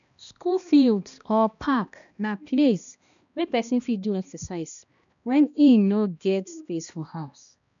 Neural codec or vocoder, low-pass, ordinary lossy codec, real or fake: codec, 16 kHz, 1 kbps, X-Codec, HuBERT features, trained on balanced general audio; 7.2 kHz; none; fake